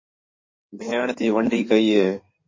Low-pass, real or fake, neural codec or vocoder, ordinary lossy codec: 7.2 kHz; fake; codec, 16 kHz in and 24 kHz out, 2.2 kbps, FireRedTTS-2 codec; MP3, 32 kbps